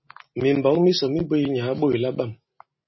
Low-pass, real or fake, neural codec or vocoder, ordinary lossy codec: 7.2 kHz; real; none; MP3, 24 kbps